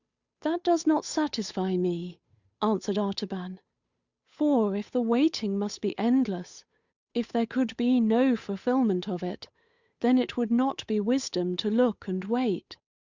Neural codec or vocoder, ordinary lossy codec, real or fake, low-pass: codec, 16 kHz, 8 kbps, FunCodec, trained on Chinese and English, 25 frames a second; Opus, 64 kbps; fake; 7.2 kHz